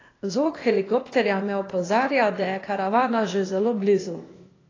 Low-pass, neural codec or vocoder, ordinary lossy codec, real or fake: 7.2 kHz; codec, 16 kHz, 0.8 kbps, ZipCodec; AAC, 32 kbps; fake